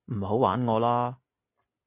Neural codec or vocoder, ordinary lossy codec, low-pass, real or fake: none; MP3, 32 kbps; 3.6 kHz; real